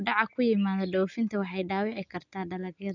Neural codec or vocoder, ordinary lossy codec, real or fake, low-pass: none; none; real; 7.2 kHz